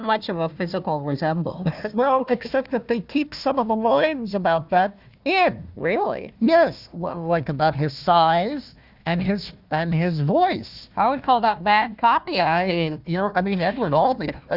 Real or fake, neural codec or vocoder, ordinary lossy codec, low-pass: fake; codec, 16 kHz, 1 kbps, FunCodec, trained on Chinese and English, 50 frames a second; Opus, 64 kbps; 5.4 kHz